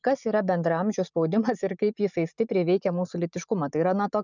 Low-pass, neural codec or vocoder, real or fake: 7.2 kHz; none; real